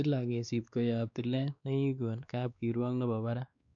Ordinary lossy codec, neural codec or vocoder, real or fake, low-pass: none; codec, 16 kHz, 2 kbps, X-Codec, WavLM features, trained on Multilingual LibriSpeech; fake; 7.2 kHz